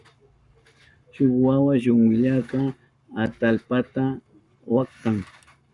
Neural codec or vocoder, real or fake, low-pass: codec, 44.1 kHz, 7.8 kbps, Pupu-Codec; fake; 10.8 kHz